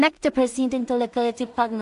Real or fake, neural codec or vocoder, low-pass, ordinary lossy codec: fake; codec, 16 kHz in and 24 kHz out, 0.4 kbps, LongCat-Audio-Codec, two codebook decoder; 10.8 kHz; AAC, 64 kbps